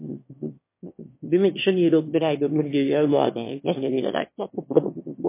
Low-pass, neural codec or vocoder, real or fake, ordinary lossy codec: 3.6 kHz; autoencoder, 22.05 kHz, a latent of 192 numbers a frame, VITS, trained on one speaker; fake; MP3, 24 kbps